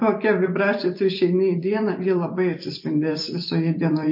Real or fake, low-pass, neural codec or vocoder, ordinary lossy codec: fake; 5.4 kHz; vocoder, 44.1 kHz, 128 mel bands every 256 samples, BigVGAN v2; MP3, 32 kbps